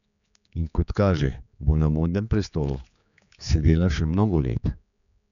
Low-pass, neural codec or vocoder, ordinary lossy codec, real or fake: 7.2 kHz; codec, 16 kHz, 4 kbps, X-Codec, HuBERT features, trained on general audio; none; fake